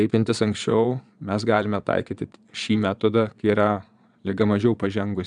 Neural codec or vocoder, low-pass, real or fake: vocoder, 22.05 kHz, 80 mel bands, Vocos; 9.9 kHz; fake